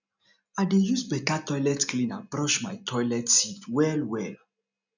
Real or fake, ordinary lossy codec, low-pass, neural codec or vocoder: real; none; 7.2 kHz; none